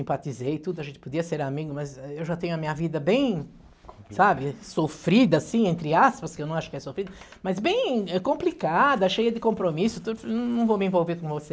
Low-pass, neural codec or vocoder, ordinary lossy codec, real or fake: none; none; none; real